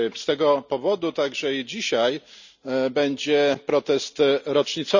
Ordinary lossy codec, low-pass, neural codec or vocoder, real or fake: none; 7.2 kHz; none; real